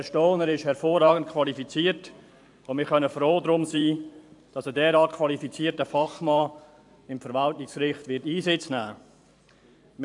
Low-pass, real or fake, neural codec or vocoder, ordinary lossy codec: 10.8 kHz; fake; vocoder, 44.1 kHz, 128 mel bands every 512 samples, BigVGAN v2; AAC, 64 kbps